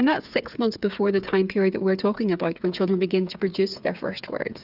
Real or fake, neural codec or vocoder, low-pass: fake; codec, 16 kHz, 8 kbps, FreqCodec, smaller model; 5.4 kHz